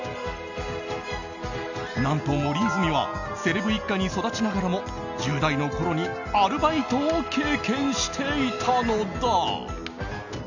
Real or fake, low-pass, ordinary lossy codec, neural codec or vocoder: real; 7.2 kHz; none; none